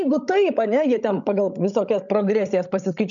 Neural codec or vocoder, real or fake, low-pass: codec, 16 kHz, 16 kbps, FreqCodec, larger model; fake; 7.2 kHz